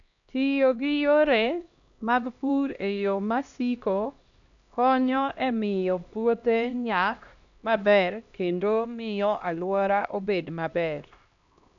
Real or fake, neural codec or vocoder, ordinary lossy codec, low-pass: fake; codec, 16 kHz, 1 kbps, X-Codec, HuBERT features, trained on LibriSpeech; none; 7.2 kHz